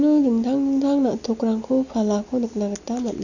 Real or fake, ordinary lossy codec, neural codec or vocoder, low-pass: real; none; none; 7.2 kHz